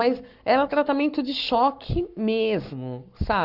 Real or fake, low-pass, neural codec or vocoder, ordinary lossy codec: fake; 5.4 kHz; codec, 44.1 kHz, 7.8 kbps, Pupu-Codec; Opus, 64 kbps